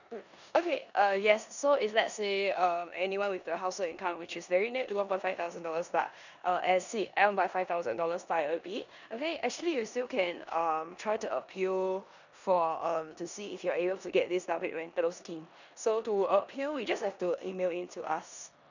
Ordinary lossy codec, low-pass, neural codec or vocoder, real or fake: none; 7.2 kHz; codec, 16 kHz in and 24 kHz out, 0.9 kbps, LongCat-Audio-Codec, four codebook decoder; fake